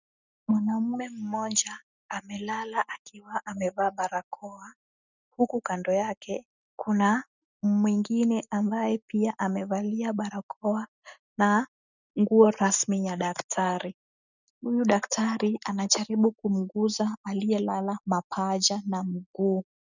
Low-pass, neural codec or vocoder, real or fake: 7.2 kHz; none; real